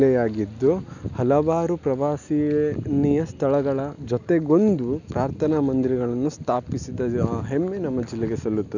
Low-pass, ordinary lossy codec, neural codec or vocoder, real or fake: 7.2 kHz; none; none; real